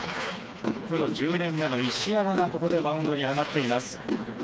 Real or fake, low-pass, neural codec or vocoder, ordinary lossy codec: fake; none; codec, 16 kHz, 2 kbps, FreqCodec, smaller model; none